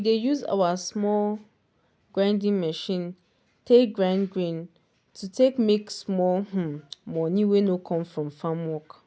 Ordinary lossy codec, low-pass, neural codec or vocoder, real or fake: none; none; none; real